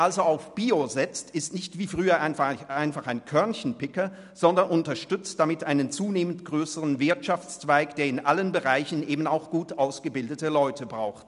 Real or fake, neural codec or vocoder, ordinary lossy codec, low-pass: real; none; none; 10.8 kHz